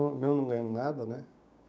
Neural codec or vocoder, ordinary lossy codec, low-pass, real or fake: codec, 16 kHz, 6 kbps, DAC; none; none; fake